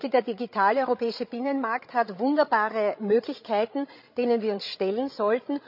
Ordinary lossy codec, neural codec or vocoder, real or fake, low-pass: none; codec, 16 kHz, 16 kbps, FreqCodec, larger model; fake; 5.4 kHz